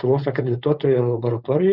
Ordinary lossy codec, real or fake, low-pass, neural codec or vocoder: Opus, 64 kbps; fake; 5.4 kHz; codec, 16 kHz, 4.8 kbps, FACodec